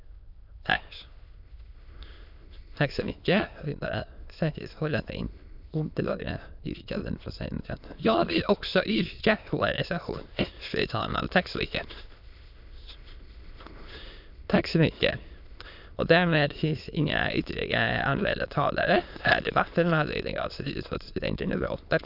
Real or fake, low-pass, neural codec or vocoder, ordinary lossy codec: fake; 5.4 kHz; autoencoder, 22.05 kHz, a latent of 192 numbers a frame, VITS, trained on many speakers; none